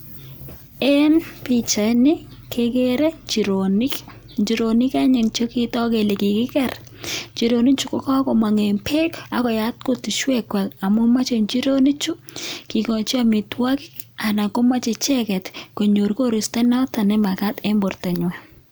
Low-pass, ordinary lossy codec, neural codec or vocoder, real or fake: none; none; none; real